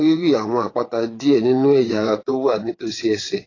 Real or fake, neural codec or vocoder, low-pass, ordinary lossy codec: fake; vocoder, 22.05 kHz, 80 mel bands, WaveNeXt; 7.2 kHz; AAC, 32 kbps